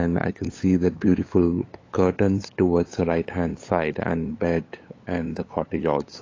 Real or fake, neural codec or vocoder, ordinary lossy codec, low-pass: fake; codec, 16 kHz, 8 kbps, FunCodec, trained on LibriTTS, 25 frames a second; AAC, 32 kbps; 7.2 kHz